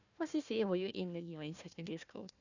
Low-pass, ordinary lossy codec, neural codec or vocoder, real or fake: 7.2 kHz; none; codec, 16 kHz, 1 kbps, FunCodec, trained on Chinese and English, 50 frames a second; fake